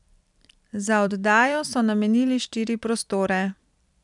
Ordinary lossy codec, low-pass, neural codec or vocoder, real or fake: none; 10.8 kHz; vocoder, 44.1 kHz, 128 mel bands every 256 samples, BigVGAN v2; fake